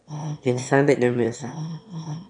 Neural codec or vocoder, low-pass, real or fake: autoencoder, 22.05 kHz, a latent of 192 numbers a frame, VITS, trained on one speaker; 9.9 kHz; fake